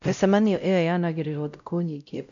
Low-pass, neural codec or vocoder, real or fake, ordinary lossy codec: 7.2 kHz; codec, 16 kHz, 0.5 kbps, X-Codec, WavLM features, trained on Multilingual LibriSpeech; fake; none